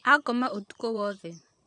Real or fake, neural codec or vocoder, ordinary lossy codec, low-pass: fake; vocoder, 22.05 kHz, 80 mel bands, Vocos; none; 9.9 kHz